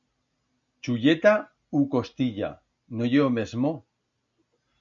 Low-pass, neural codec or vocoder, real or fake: 7.2 kHz; none; real